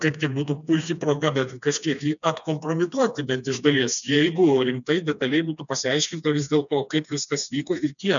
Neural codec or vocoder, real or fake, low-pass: codec, 16 kHz, 2 kbps, FreqCodec, smaller model; fake; 7.2 kHz